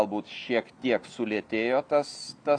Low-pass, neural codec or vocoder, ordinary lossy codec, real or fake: 9.9 kHz; none; AAC, 64 kbps; real